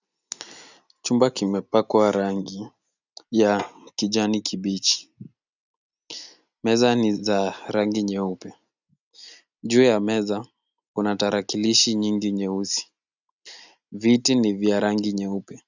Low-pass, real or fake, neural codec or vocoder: 7.2 kHz; real; none